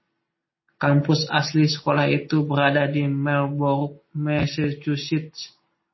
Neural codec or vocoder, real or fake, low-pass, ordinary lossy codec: none; real; 7.2 kHz; MP3, 24 kbps